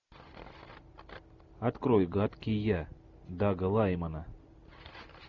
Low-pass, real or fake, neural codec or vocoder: 7.2 kHz; real; none